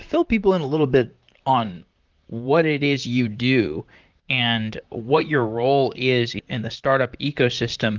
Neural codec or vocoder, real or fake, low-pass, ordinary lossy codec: vocoder, 44.1 kHz, 128 mel bands, Pupu-Vocoder; fake; 7.2 kHz; Opus, 24 kbps